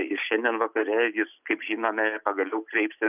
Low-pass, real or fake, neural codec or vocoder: 3.6 kHz; real; none